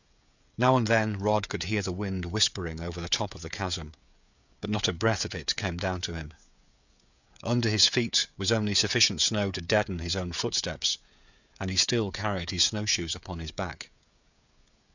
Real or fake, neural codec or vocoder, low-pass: fake; codec, 16 kHz, 16 kbps, FreqCodec, smaller model; 7.2 kHz